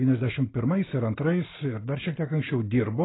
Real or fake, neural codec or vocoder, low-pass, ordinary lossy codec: real; none; 7.2 kHz; AAC, 16 kbps